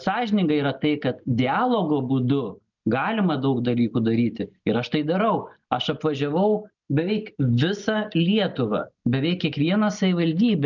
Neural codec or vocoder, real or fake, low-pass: none; real; 7.2 kHz